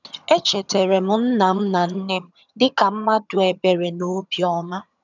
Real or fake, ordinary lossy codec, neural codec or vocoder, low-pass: fake; none; vocoder, 22.05 kHz, 80 mel bands, HiFi-GAN; 7.2 kHz